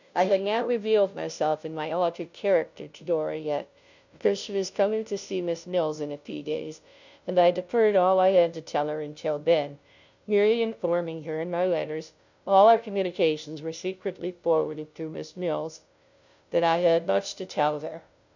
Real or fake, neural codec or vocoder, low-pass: fake; codec, 16 kHz, 0.5 kbps, FunCodec, trained on Chinese and English, 25 frames a second; 7.2 kHz